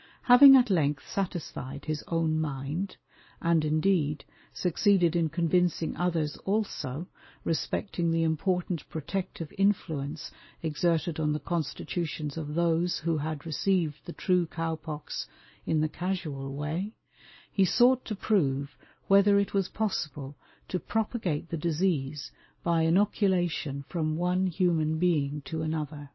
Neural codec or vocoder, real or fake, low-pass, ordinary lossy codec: none; real; 7.2 kHz; MP3, 24 kbps